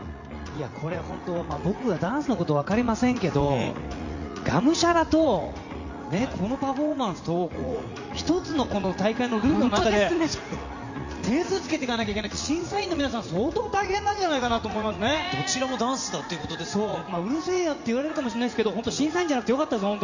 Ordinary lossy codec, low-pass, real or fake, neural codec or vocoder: none; 7.2 kHz; fake; vocoder, 44.1 kHz, 80 mel bands, Vocos